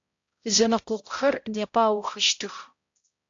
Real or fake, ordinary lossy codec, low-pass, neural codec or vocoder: fake; AAC, 48 kbps; 7.2 kHz; codec, 16 kHz, 0.5 kbps, X-Codec, HuBERT features, trained on balanced general audio